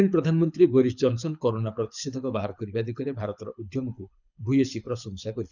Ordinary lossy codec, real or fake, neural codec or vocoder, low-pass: none; fake; codec, 24 kHz, 6 kbps, HILCodec; 7.2 kHz